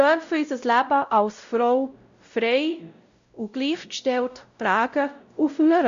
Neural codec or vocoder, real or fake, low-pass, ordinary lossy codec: codec, 16 kHz, 0.5 kbps, X-Codec, WavLM features, trained on Multilingual LibriSpeech; fake; 7.2 kHz; Opus, 64 kbps